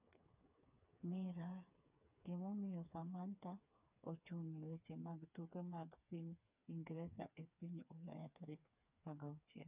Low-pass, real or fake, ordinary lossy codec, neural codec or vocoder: 3.6 kHz; fake; none; codec, 16 kHz, 4 kbps, FreqCodec, smaller model